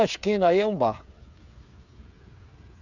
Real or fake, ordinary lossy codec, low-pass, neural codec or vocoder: fake; none; 7.2 kHz; codec, 16 kHz, 8 kbps, FreqCodec, smaller model